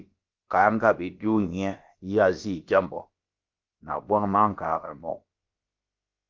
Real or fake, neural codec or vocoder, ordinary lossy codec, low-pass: fake; codec, 16 kHz, about 1 kbps, DyCAST, with the encoder's durations; Opus, 32 kbps; 7.2 kHz